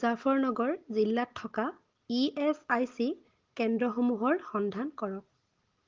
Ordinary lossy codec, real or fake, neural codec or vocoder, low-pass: Opus, 16 kbps; real; none; 7.2 kHz